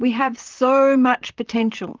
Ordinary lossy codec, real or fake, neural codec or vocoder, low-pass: Opus, 32 kbps; fake; vocoder, 44.1 kHz, 128 mel bands, Pupu-Vocoder; 7.2 kHz